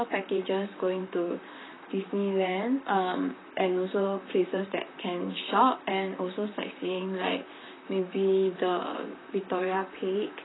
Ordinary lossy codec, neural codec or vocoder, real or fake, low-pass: AAC, 16 kbps; vocoder, 44.1 kHz, 128 mel bands, Pupu-Vocoder; fake; 7.2 kHz